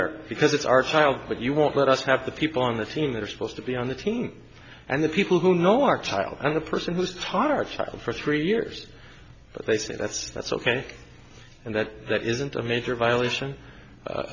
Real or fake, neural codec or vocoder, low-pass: real; none; 7.2 kHz